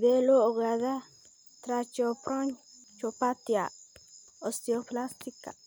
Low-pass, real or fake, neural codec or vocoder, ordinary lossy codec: none; real; none; none